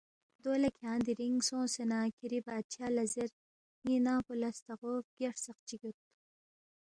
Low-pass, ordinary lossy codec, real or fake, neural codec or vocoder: 9.9 kHz; Opus, 64 kbps; real; none